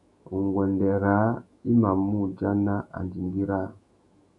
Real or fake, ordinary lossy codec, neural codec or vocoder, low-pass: fake; MP3, 96 kbps; autoencoder, 48 kHz, 128 numbers a frame, DAC-VAE, trained on Japanese speech; 10.8 kHz